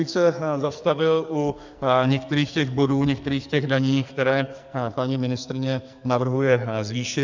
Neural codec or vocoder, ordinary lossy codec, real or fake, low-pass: codec, 44.1 kHz, 2.6 kbps, SNAC; MP3, 64 kbps; fake; 7.2 kHz